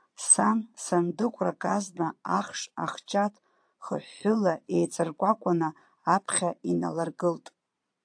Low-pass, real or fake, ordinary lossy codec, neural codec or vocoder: 9.9 kHz; fake; AAC, 64 kbps; vocoder, 22.05 kHz, 80 mel bands, Vocos